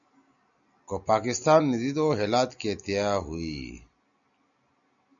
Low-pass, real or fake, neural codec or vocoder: 7.2 kHz; real; none